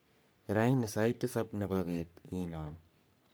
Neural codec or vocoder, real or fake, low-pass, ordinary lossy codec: codec, 44.1 kHz, 3.4 kbps, Pupu-Codec; fake; none; none